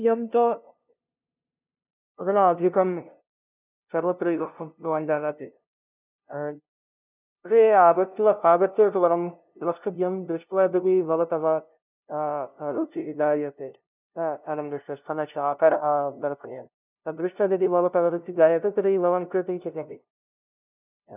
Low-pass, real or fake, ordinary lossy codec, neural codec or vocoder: 3.6 kHz; fake; none; codec, 16 kHz, 0.5 kbps, FunCodec, trained on LibriTTS, 25 frames a second